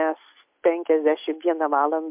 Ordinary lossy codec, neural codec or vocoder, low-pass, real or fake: MP3, 32 kbps; none; 3.6 kHz; real